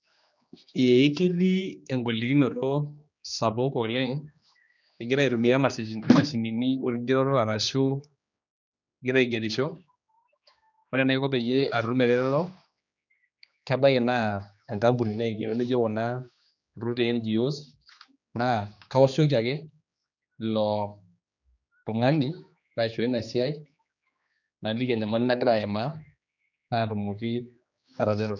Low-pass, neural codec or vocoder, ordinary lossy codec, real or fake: 7.2 kHz; codec, 16 kHz, 2 kbps, X-Codec, HuBERT features, trained on general audio; none; fake